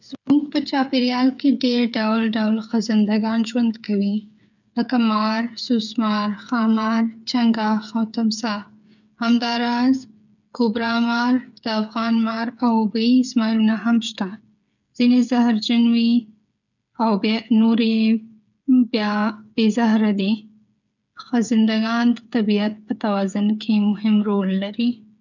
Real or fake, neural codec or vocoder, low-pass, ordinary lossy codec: fake; codec, 16 kHz, 8 kbps, FreqCodec, smaller model; 7.2 kHz; none